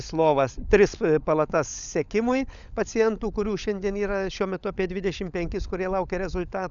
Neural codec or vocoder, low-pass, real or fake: codec, 16 kHz, 16 kbps, FunCodec, trained on LibriTTS, 50 frames a second; 7.2 kHz; fake